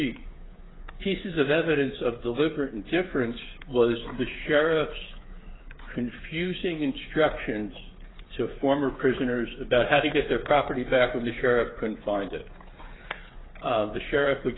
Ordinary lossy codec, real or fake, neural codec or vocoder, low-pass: AAC, 16 kbps; fake; codec, 16 kHz, 8 kbps, FreqCodec, smaller model; 7.2 kHz